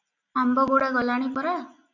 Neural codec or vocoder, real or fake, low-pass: none; real; 7.2 kHz